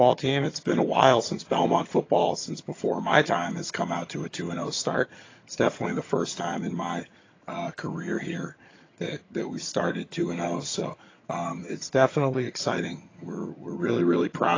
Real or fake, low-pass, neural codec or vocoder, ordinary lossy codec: fake; 7.2 kHz; vocoder, 22.05 kHz, 80 mel bands, HiFi-GAN; AAC, 32 kbps